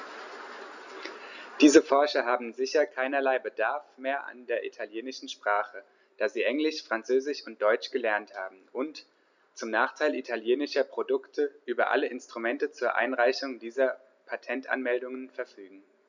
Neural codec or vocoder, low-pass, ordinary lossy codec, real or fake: none; 7.2 kHz; none; real